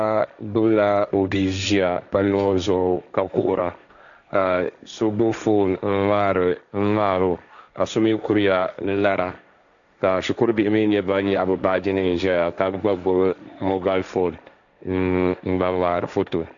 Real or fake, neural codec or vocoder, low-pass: fake; codec, 16 kHz, 1.1 kbps, Voila-Tokenizer; 7.2 kHz